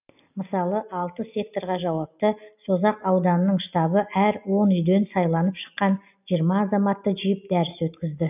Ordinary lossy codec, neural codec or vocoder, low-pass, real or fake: none; none; 3.6 kHz; real